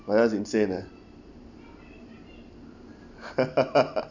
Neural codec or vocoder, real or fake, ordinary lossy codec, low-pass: none; real; none; 7.2 kHz